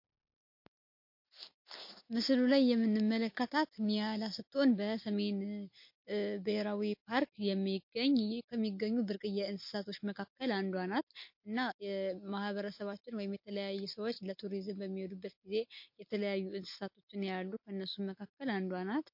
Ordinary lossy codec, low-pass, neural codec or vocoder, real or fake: MP3, 32 kbps; 5.4 kHz; none; real